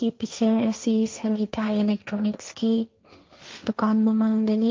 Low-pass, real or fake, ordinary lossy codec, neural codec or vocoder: 7.2 kHz; fake; Opus, 24 kbps; codec, 16 kHz, 1.1 kbps, Voila-Tokenizer